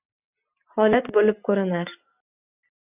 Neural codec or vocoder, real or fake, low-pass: none; real; 3.6 kHz